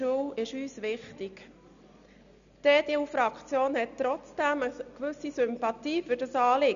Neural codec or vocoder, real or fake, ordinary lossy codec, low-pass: none; real; none; 7.2 kHz